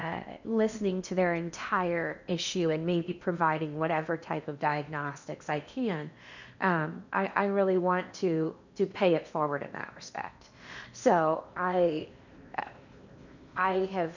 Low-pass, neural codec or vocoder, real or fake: 7.2 kHz; codec, 16 kHz in and 24 kHz out, 0.8 kbps, FocalCodec, streaming, 65536 codes; fake